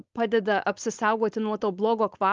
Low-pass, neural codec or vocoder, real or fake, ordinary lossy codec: 7.2 kHz; codec, 16 kHz, 4.8 kbps, FACodec; fake; Opus, 32 kbps